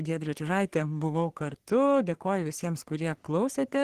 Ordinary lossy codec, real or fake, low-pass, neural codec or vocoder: Opus, 16 kbps; fake; 14.4 kHz; codec, 44.1 kHz, 3.4 kbps, Pupu-Codec